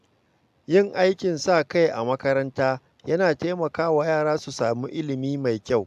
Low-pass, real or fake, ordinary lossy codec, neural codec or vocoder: 14.4 kHz; real; AAC, 96 kbps; none